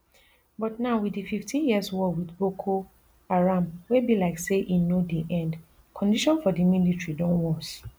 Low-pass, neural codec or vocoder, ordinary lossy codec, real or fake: none; none; none; real